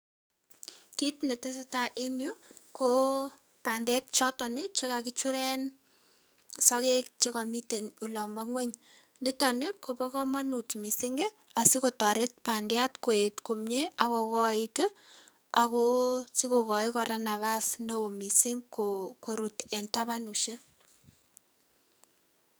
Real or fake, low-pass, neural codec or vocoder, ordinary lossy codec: fake; none; codec, 44.1 kHz, 2.6 kbps, SNAC; none